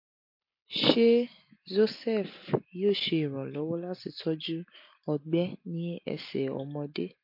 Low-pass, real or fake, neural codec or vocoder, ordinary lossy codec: 5.4 kHz; real; none; MP3, 32 kbps